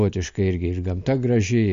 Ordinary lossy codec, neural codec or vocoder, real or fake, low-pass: MP3, 48 kbps; none; real; 7.2 kHz